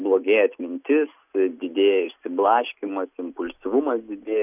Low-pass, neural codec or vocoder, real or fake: 3.6 kHz; none; real